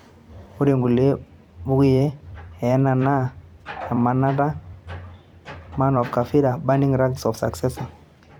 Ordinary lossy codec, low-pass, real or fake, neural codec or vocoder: none; 19.8 kHz; fake; vocoder, 44.1 kHz, 128 mel bands every 256 samples, BigVGAN v2